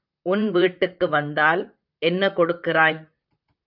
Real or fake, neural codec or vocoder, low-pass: fake; vocoder, 44.1 kHz, 128 mel bands, Pupu-Vocoder; 5.4 kHz